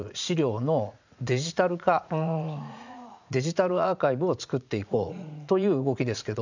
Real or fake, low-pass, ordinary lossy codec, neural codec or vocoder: fake; 7.2 kHz; none; vocoder, 44.1 kHz, 80 mel bands, Vocos